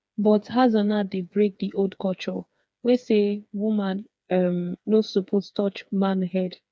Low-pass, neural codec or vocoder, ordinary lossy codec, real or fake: none; codec, 16 kHz, 4 kbps, FreqCodec, smaller model; none; fake